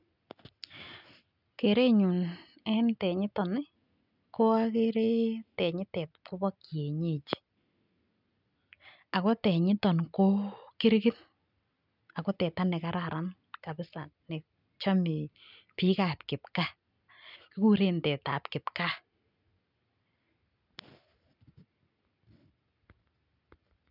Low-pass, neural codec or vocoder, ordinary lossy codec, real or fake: 5.4 kHz; none; none; real